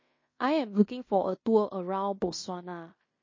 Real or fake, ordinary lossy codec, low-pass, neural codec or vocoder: fake; MP3, 32 kbps; 7.2 kHz; codec, 16 kHz in and 24 kHz out, 0.9 kbps, LongCat-Audio-Codec, fine tuned four codebook decoder